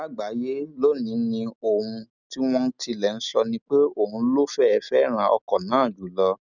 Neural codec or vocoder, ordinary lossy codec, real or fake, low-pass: none; none; real; 7.2 kHz